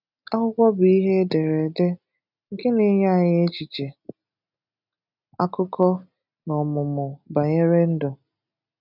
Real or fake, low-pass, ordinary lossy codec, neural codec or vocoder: real; 5.4 kHz; none; none